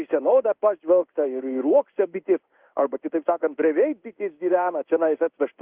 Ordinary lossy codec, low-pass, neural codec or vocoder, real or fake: Opus, 24 kbps; 3.6 kHz; codec, 16 kHz in and 24 kHz out, 1 kbps, XY-Tokenizer; fake